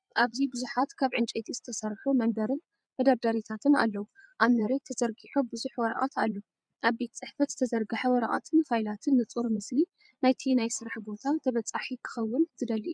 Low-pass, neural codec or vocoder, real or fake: 9.9 kHz; vocoder, 22.05 kHz, 80 mel bands, Vocos; fake